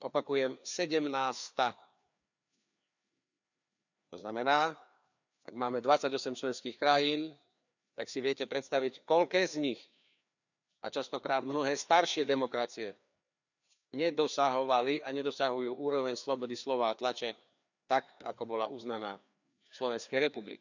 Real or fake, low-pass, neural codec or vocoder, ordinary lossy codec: fake; 7.2 kHz; codec, 16 kHz, 2 kbps, FreqCodec, larger model; none